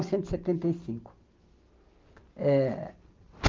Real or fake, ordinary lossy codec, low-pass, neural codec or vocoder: real; Opus, 24 kbps; 7.2 kHz; none